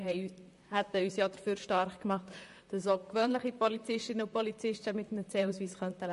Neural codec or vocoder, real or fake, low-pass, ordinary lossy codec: vocoder, 44.1 kHz, 128 mel bands, Pupu-Vocoder; fake; 14.4 kHz; MP3, 48 kbps